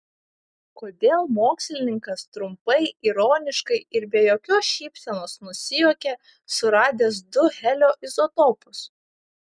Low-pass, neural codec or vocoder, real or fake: 9.9 kHz; none; real